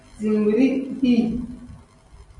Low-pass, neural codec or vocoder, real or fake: 10.8 kHz; none; real